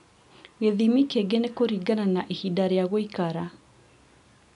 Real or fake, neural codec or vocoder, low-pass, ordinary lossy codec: real; none; 10.8 kHz; none